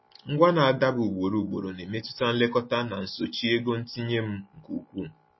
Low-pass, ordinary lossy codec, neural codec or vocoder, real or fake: 7.2 kHz; MP3, 24 kbps; none; real